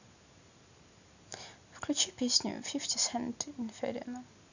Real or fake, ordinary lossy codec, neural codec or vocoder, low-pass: real; none; none; 7.2 kHz